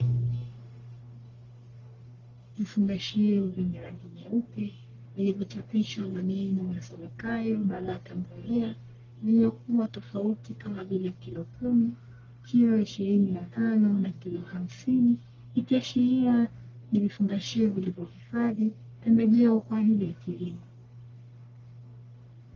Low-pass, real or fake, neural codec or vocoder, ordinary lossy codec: 7.2 kHz; fake; codec, 44.1 kHz, 1.7 kbps, Pupu-Codec; Opus, 32 kbps